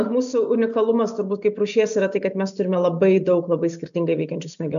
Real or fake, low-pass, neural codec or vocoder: real; 7.2 kHz; none